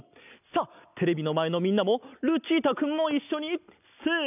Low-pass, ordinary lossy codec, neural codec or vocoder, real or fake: 3.6 kHz; none; none; real